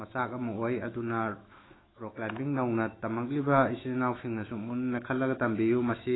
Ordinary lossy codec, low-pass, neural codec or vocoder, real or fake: AAC, 16 kbps; 7.2 kHz; autoencoder, 48 kHz, 128 numbers a frame, DAC-VAE, trained on Japanese speech; fake